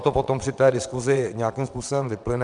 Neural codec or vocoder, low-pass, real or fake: vocoder, 22.05 kHz, 80 mel bands, WaveNeXt; 9.9 kHz; fake